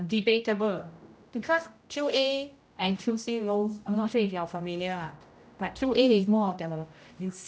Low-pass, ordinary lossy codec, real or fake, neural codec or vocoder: none; none; fake; codec, 16 kHz, 0.5 kbps, X-Codec, HuBERT features, trained on general audio